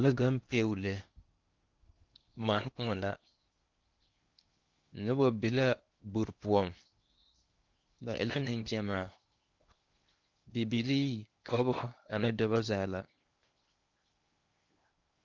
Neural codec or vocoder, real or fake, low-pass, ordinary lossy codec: codec, 16 kHz in and 24 kHz out, 0.8 kbps, FocalCodec, streaming, 65536 codes; fake; 7.2 kHz; Opus, 32 kbps